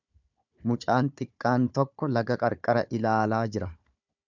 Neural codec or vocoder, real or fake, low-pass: codec, 16 kHz, 4 kbps, FunCodec, trained on Chinese and English, 50 frames a second; fake; 7.2 kHz